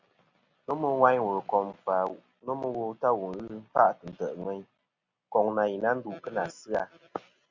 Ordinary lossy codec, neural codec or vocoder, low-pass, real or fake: Opus, 64 kbps; none; 7.2 kHz; real